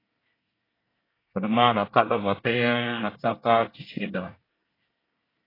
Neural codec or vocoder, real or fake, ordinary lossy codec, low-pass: codec, 24 kHz, 1 kbps, SNAC; fake; AAC, 24 kbps; 5.4 kHz